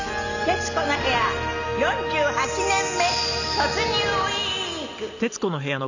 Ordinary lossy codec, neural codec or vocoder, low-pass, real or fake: none; none; 7.2 kHz; real